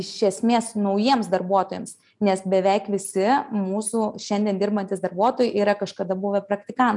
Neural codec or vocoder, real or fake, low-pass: none; real; 10.8 kHz